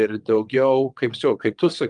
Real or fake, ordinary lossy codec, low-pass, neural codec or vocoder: fake; Opus, 32 kbps; 9.9 kHz; vocoder, 22.05 kHz, 80 mel bands, WaveNeXt